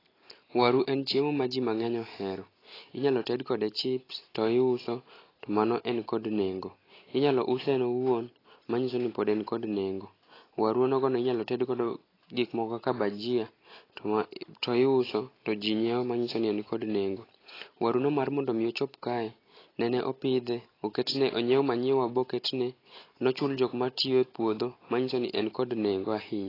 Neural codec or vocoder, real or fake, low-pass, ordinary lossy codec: none; real; 5.4 kHz; AAC, 24 kbps